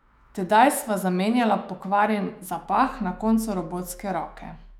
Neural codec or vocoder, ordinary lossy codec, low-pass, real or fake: autoencoder, 48 kHz, 128 numbers a frame, DAC-VAE, trained on Japanese speech; none; 19.8 kHz; fake